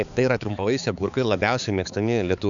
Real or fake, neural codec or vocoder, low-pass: fake; codec, 16 kHz, 4 kbps, X-Codec, HuBERT features, trained on balanced general audio; 7.2 kHz